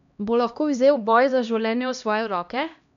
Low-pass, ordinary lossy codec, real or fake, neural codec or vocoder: 7.2 kHz; none; fake; codec, 16 kHz, 1 kbps, X-Codec, HuBERT features, trained on LibriSpeech